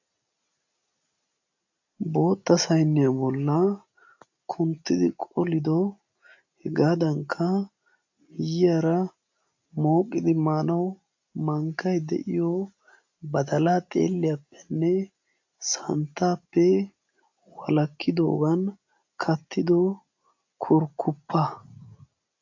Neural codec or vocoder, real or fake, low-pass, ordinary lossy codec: none; real; 7.2 kHz; AAC, 48 kbps